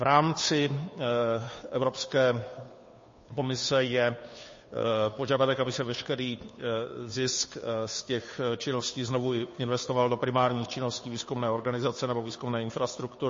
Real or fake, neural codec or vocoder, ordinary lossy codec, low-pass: fake; codec, 16 kHz, 2 kbps, FunCodec, trained on Chinese and English, 25 frames a second; MP3, 32 kbps; 7.2 kHz